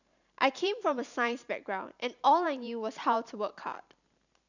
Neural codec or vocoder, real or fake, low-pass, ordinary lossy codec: vocoder, 44.1 kHz, 128 mel bands every 512 samples, BigVGAN v2; fake; 7.2 kHz; none